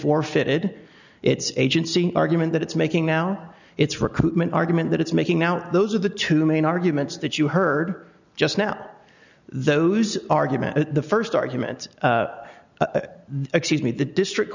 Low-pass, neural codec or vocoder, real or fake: 7.2 kHz; none; real